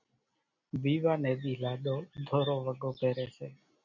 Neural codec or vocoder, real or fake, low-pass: none; real; 7.2 kHz